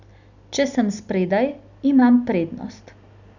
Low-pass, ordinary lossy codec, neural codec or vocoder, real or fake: 7.2 kHz; none; none; real